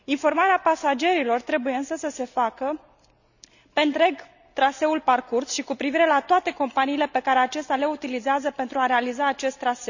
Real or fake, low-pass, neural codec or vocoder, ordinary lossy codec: real; 7.2 kHz; none; none